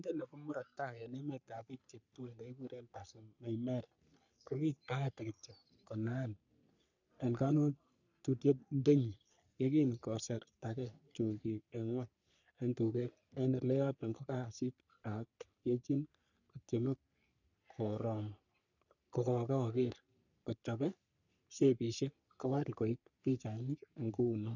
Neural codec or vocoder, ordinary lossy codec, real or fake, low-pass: codec, 44.1 kHz, 3.4 kbps, Pupu-Codec; none; fake; 7.2 kHz